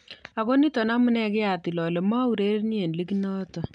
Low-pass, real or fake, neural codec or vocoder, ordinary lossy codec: 9.9 kHz; real; none; none